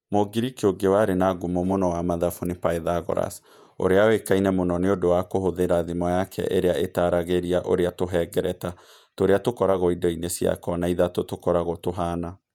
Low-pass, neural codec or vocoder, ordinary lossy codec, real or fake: 19.8 kHz; vocoder, 48 kHz, 128 mel bands, Vocos; none; fake